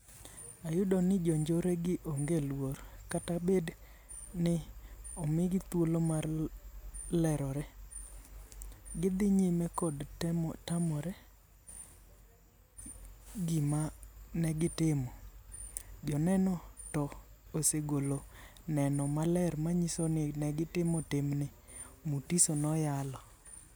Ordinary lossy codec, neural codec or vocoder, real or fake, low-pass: none; none; real; none